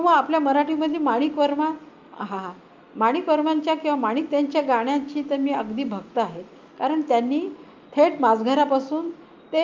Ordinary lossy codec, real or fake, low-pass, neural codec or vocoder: Opus, 24 kbps; real; 7.2 kHz; none